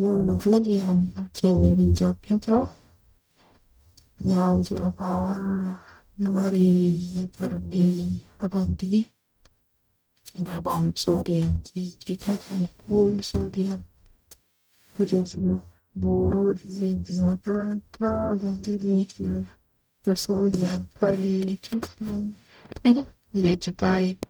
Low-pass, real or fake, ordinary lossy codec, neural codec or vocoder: none; fake; none; codec, 44.1 kHz, 0.9 kbps, DAC